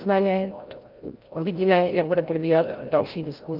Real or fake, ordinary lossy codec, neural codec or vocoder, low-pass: fake; Opus, 32 kbps; codec, 16 kHz, 0.5 kbps, FreqCodec, larger model; 5.4 kHz